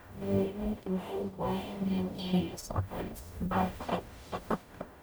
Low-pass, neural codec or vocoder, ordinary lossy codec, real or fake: none; codec, 44.1 kHz, 0.9 kbps, DAC; none; fake